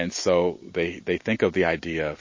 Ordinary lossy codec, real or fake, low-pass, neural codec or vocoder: MP3, 32 kbps; real; 7.2 kHz; none